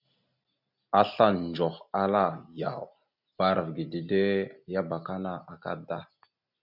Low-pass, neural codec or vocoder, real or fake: 5.4 kHz; none; real